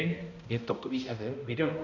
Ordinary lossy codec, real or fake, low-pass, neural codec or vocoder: none; fake; 7.2 kHz; codec, 16 kHz, 0.5 kbps, X-Codec, HuBERT features, trained on balanced general audio